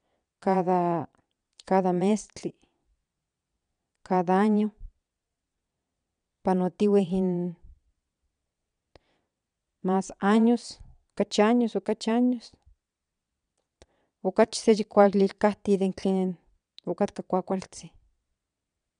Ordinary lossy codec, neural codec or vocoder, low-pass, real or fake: none; vocoder, 22.05 kHz, 80 mel bands, Vocos; 9.9 kHz; fake